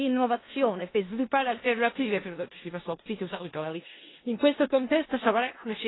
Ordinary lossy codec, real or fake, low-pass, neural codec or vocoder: AAC, 16 kbps; fake; 7.2 kHz; codec, 16 kHz in and 24 kHz out, 0.4 kbps, LongCat-Audio-Codec, four codebook decoder